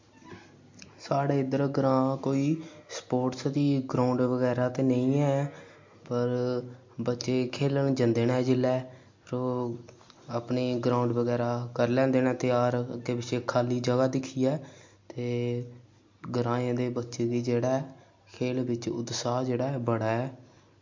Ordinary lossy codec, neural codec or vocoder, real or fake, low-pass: MP3, 48 kbps; none; real; 7.2 kHz